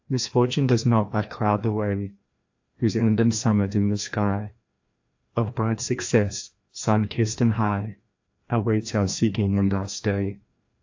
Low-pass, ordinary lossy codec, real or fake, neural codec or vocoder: 7.2 kHz; AAC, 48 kbps; fake; codec, 16 kHz, 1 kbps, FreqCodec, larger model